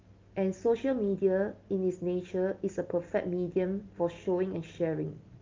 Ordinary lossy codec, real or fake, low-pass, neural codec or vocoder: Opus, 24 kbps; real; 7.2 kHz; none